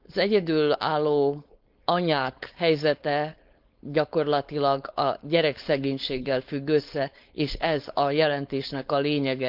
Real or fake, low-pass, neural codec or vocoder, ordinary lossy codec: fake; 5.4 kHz; codec, 16 kHz, 4.8 kbps, FACodec; Opus, 24 kbps